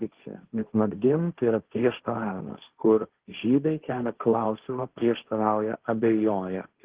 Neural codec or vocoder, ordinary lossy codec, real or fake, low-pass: codec, 16 kHz, 1.1 kbps, Voila-Tokenizer; Opus, 16 kbps; fake; 3.6 kHz